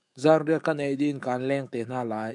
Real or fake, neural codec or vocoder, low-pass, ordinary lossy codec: fake; autoencoder, 48 kHz, 128 numbers a frame, DAC-VAE, trained on Japanese speech; 10.8 kHz; MP3, 96 kbps